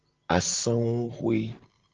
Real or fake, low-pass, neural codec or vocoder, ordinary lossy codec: real; 7.2 kHz; none; Opus, 16 kbps